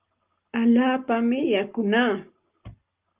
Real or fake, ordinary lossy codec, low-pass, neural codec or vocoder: real; Opus, 32 kbps; 3.6 kHz; none